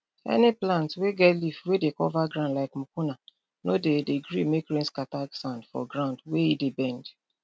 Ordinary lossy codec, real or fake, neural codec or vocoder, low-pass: none; real; none; none